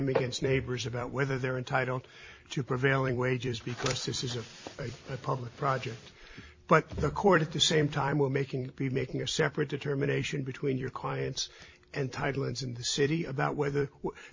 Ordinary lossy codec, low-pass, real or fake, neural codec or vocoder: MP3, 32 kbps; 7.2 kHz; fake; vocoder, 44.1 kHz, 128 mel bands every 256 samples, BigVGAN v2